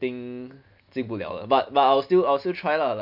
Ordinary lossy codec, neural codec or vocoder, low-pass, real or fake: MP3, 48 kbps; none; 5.4 kHz; real